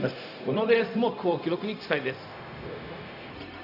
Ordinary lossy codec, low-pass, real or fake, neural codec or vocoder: none; 5.4 kHz; fake; codec, 16 kHz, 0.4 kbps, LongCat-Audio-Codec